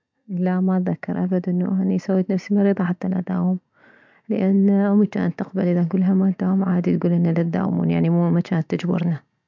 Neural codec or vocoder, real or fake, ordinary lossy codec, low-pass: none; real; MP3, 64 kbps; 7.2 kHz